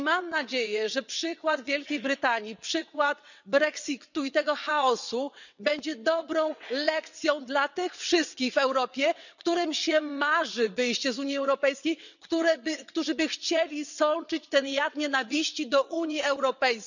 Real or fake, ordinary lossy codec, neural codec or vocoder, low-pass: fake; none; vocoder, 22.05 kHz, 80 mel bands, WaveNeXt; 7.2 kHz